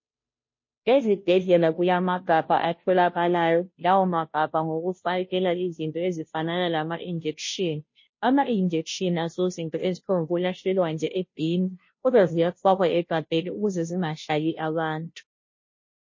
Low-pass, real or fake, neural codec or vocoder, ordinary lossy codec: 7.2 kHz; fake; codec, 16 kHz, 0.5 kbps, FunCodec, trained on Chinese and English, 25 frames a second; MP3, 32 kbps